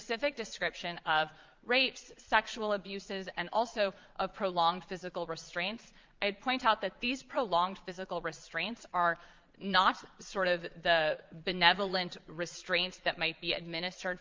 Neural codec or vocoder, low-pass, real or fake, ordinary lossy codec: vocoder, 22.05 kHz, 80 mel bands, Vocos; 7.2 kHz; fake; Opus, 24 kbps